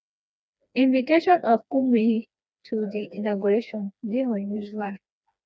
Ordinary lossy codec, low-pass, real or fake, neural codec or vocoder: none; none; fake; codec, 16 kHz, 2 kbps, FreqCodec, smaller model